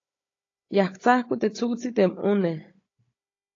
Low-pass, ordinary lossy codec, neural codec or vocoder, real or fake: 7.2 kHz; AAC, 32 kbps; codec, 16 kHz, 16 kbps, FunCodec, trained on Chinese and English, 50 frames a second; fake